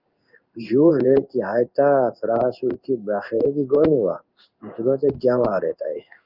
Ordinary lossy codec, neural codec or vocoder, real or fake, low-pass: Opus, 24 kbps; codec, 16 kHz in and 24 kHz out, 1 kbps, XY-Tokenizer; fake; 5.4 kHz